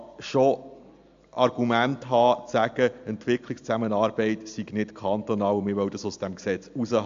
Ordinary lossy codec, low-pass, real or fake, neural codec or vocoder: AAC, 64 kbps; 7.2 kHz; real; none